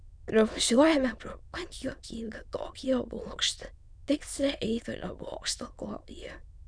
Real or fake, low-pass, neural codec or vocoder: fake; 9.9 kHz; autoencoder, 22.05 kHz, a latent of 192 numbers a frame, VITS, trained on many speakers